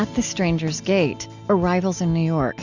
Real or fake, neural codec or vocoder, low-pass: real; none; 7.2 kHz